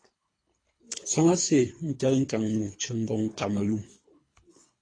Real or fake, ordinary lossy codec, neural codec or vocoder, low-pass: fake; AAC, 32 kbps; codec, 24 kHz, 3 kbps, HILCodec; 9.9 kHz